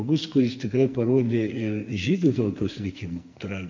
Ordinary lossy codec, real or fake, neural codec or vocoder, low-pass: MP3, 48 kbps; fake; codec, 44.1 kHz, 2.6 kbps, SNAC; 7.2 kHz